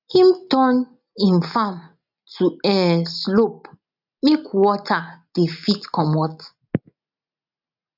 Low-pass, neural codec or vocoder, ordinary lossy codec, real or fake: 5.4 kHz; none; none; real